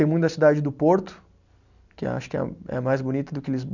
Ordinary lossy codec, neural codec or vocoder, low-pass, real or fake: none; none; 7.2 kHz; real